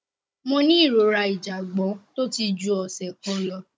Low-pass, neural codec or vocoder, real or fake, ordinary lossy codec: none; codec, 16 kHz, 16 kbps, FunCodec, trained on Chinese and English, 50 frames a second; fake; none